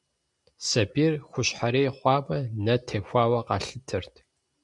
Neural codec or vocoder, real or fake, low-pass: none; real; 10.8 kHz